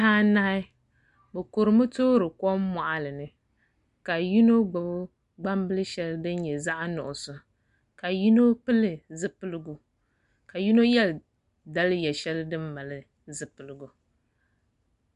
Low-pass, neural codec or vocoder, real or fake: 10.8 kHz; none; real